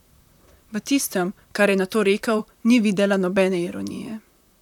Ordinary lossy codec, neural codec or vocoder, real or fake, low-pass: none; vocoder, 44.1 kHz, 128 mel bands, Pupu-Vocoder; fake; 19.8 kHz